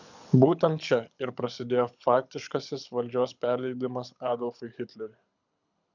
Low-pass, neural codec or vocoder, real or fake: 7.2 kHz; codec, 24 kHz, 6 kbps, HILCodec; fake